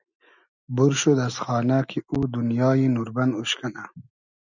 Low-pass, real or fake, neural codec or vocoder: 7.2 kHz; real; none